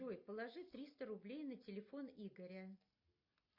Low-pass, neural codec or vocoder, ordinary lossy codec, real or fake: 5.4 kHz; none; MP3, 32 kbps; real